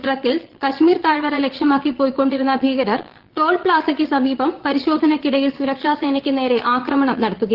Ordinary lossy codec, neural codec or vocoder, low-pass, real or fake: Opus, 16 kbps; vocoder, 22.05 kHz, 80 mel bands, Vocos; 5.4 kHz; fake